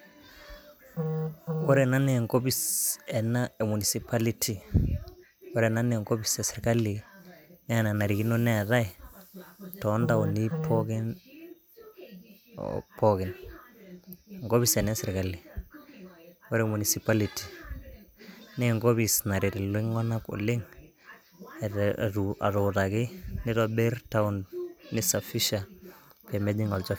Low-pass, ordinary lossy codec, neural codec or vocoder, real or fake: none; none; none; real